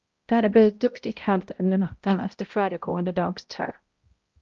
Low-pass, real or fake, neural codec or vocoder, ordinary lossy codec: 7.2 kHz; fake; codec, 16 kHz, 0.5 kbps, X-Codec, HuBERT features, trained on balanced general audio; Opus, 32 kbps